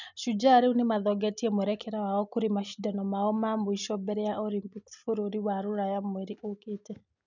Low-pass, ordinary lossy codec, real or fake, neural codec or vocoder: 7.2 kHz; none; real; none